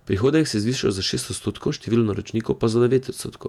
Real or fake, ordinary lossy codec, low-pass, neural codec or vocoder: fake; none; 19.8 kHz; vocoder, 48 kHz, 128 mel bands, Vocos